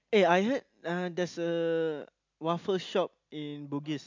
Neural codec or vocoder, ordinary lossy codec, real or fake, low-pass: none; MP3, 64 kbps; real; 7.2 kHz